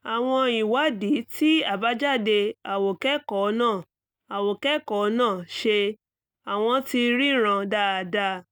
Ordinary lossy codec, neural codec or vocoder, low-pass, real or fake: none; none; 19.8 kHz; real